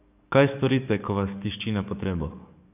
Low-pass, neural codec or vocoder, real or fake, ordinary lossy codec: 3.6 kHz; codec, 44.1 kHz, 7.8 kbps, DAC; fake; none